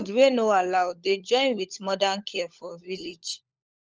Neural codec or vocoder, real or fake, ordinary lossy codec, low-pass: codec, 16 kHz, 16 kbps, FunCodec, trained on LibriTTS, 50 frames a second; fake; Opus, 24 kbps; 7.2 kHz